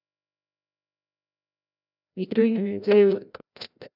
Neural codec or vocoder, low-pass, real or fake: codec, 16 kHz, 0.5 kbps, FreqCodec, larger model; 5.4 kHz; fake